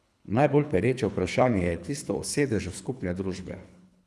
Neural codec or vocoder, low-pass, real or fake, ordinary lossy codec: codec, 24 kHz, 3 kbps, HILCodec; none; fake; none